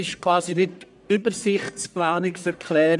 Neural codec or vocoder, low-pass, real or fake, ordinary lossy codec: codec, 44.1 kHz, 1.7 kbps, Pupu-Codec; 10.8 kHz; fake; none